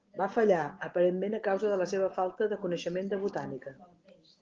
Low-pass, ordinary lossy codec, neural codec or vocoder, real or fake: 7.2 kHz; Opus, 16 kbps; none; real